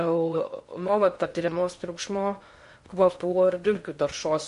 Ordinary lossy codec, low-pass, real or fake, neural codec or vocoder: MP3, 48 kbps; 10.8 kHz; fake; codec, 16 kHz in and 24 kHz out, 0.6 kbps, FocalCodec, streaming, 2048 codes